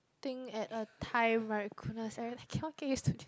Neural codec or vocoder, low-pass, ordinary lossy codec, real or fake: none; none; none; real